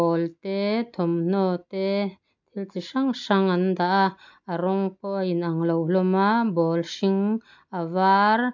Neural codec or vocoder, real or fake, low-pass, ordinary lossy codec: none; real; 7.2 kHz; MP3, 64 kbps